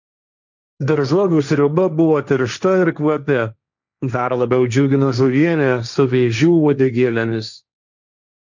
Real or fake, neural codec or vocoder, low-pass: fake; codec, 16 kHz, 1.1 kbps, Voila-Tokenizer; 7.2 kHz